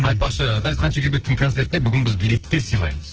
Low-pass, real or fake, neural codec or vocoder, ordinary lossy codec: 7.2 kHz; fake; codec, 44.1 kHz, 2.6 kbps, SNAC; Opus, 16 kbps